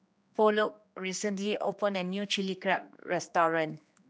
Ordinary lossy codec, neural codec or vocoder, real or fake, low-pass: none; codec, 16 kHz, 2 kbps, X-Codec, HuBERT features, trained on general audio; fake; none